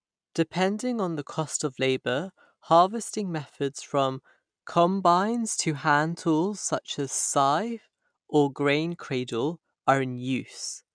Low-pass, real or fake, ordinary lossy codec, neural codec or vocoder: 9.9 kHz; real; MP3, 96 kbps; none